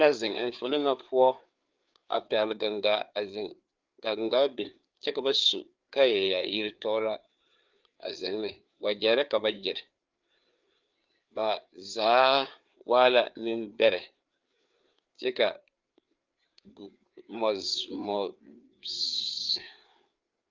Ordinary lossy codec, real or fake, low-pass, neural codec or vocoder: Opus, 24 kbps; fake; 7.2 kHz; codec, 16 kHz, 4 kbps, FreqCodec, larger model